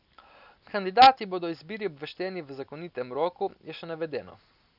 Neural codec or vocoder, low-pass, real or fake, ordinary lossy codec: none; 5.4 kHz; real; none